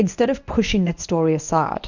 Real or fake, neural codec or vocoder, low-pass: fake; codec, 24 kHz, 0.9 kbps, WavTokenizer, medium speech release version 1; 7.2 kHz